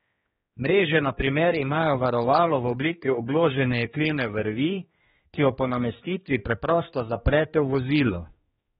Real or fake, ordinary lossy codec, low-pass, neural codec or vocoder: fake; AAC, 16 kbps; 7.2 kHz; codec, 16 kHz, 2 kbps, X-Codec, HuBERT features, trained on general audio